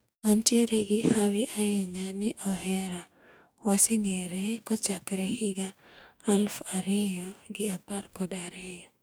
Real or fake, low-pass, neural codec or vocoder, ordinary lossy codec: fake; none; codec, 44.1 kHz, 2.6 kbps, DAC; none